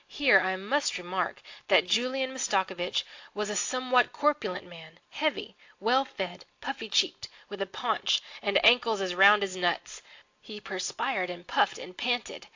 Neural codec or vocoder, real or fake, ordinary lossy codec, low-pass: none; real; AAC, 48 kbps; 7.2 kHz